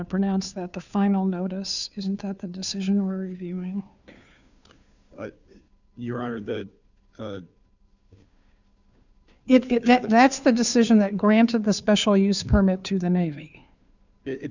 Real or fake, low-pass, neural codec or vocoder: fake; 7.2 kHz; codec, 16 kHz, 2 kbps, FunCodec, trained on Chinese and English, 25 frames a second